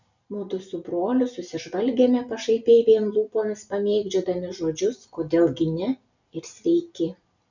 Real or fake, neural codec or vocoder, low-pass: real; none; 7.2 kHz